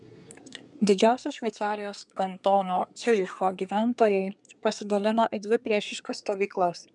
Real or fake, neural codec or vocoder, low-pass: fake; codec, 24 kHz, 1 kbps, SNAC; 10.8 kHz